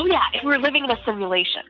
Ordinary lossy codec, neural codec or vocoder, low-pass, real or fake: AAC, 48 kbps; none; 7.2 kHz; real